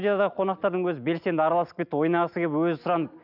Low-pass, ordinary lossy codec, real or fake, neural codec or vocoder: 5.4 kHz; none; real; none